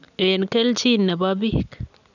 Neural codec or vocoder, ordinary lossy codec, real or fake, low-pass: none; none; real; 7.2 kHz